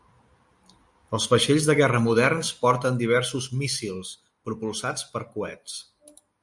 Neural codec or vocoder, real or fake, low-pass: none; real; 10.8 kHz